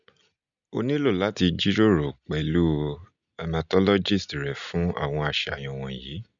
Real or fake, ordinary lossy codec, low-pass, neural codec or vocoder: real; none; 7.2 kHz; none